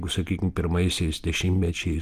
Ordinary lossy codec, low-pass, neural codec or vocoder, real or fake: Opus, 24 kbps; 14.4 kHz; none; real